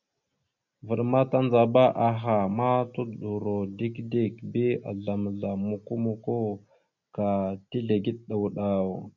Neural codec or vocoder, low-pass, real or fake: none; 7.2 kHz; real